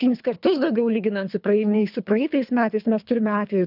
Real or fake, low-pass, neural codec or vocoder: fake; 5.4 kHz; codec, 24 kHz, 3 kbps, HILCodec